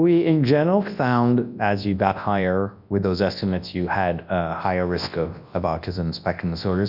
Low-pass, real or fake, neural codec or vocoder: 5.4 kHz; fake; codec, 24 kHz, 0.9 kbps, WavTokenizer, large speech release